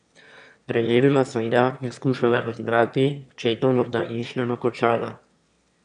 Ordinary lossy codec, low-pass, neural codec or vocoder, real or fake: none; 9.9 kHz; autoencoder, 22.05 kHz, a latent of 192 numbers a frame, VITS, trained on one speaker; fake